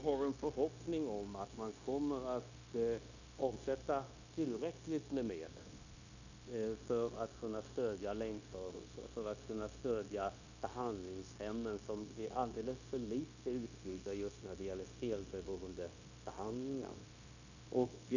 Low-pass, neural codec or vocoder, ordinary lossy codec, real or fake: 7.2 kHz; codec, 16 kHz, 0.9 kbps, LongCat-Audio-Codec; none; fake